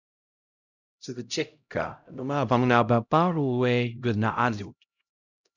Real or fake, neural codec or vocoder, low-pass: fake; codec, 16 kHz, 0.5 kbps, X-Codec, HuBERT features, trained on LibriSpeech; 7.2 kHz